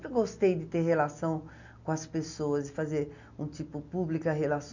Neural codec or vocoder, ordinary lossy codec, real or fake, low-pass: none; none; real; 7.2 kHz